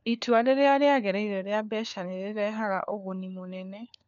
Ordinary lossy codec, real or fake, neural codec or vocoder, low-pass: MP3, 96 kbps; fake; codec, 16 kHz, 4 kbps, FunCodec, trained on LibriTTS, 50 frames a second; 7.2 kHz